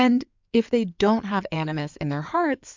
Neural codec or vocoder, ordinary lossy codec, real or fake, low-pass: codec, 16 kHz in and 24 kHz out, 2.2 kbps, FireRedTTS-2 codec; MP3, 64 kbps; fake; 7.2 kHz